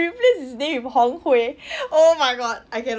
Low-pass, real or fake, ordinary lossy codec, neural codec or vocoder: none; real; none; none